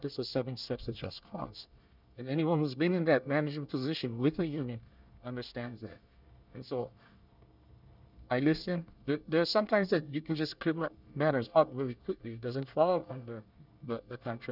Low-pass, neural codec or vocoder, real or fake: 5.4 kHz; codec, 24 kHz, 1 kbps, SNAC; fake